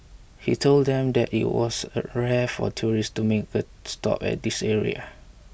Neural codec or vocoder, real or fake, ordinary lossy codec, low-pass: none; real; none; none